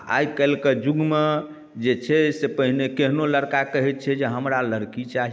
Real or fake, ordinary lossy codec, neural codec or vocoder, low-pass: real; none; none; none